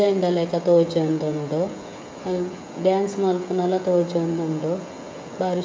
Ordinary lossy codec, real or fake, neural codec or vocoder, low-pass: none; fake; codec, 16 kHz, 16 kbps, FreqCodec, smaller model; none